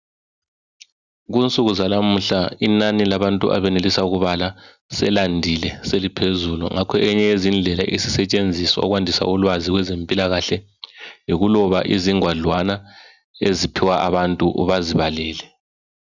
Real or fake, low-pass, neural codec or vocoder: real; 7.2 kHz; none